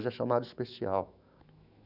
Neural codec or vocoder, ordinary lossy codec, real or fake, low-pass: autoencoder, 48 kHz, 128 numbers a frame, DAC-VAE, trained on Japanese speech; none; fake; 5.4 kHz